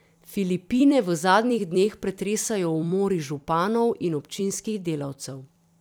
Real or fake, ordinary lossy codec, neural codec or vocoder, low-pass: fake; none; vocoder, 44.1 kHz, 128 mel bands every 256 samples, BigVGAN v2; none